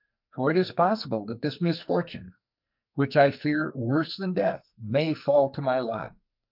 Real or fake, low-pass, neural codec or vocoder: fake; 5.4 kHz; codec, 44.1 kHz, 2.6 kbps, SNAC